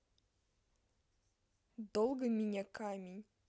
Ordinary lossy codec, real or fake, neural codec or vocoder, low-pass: none; real; none; none